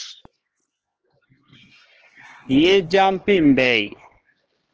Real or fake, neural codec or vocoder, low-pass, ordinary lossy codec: fake; codec, 16 kHz, 2 kbps, X-Codec, HuBERT features, trained on LibriSpeech; 7.2 kHz; Opus, 16 kbps